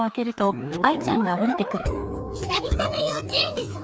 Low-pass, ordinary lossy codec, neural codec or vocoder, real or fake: none; none; codec, 16 kHz, 2 kbps, FreqCodec, larger model; fake